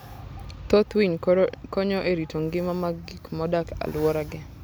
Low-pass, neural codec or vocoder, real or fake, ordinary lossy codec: none; none; real; none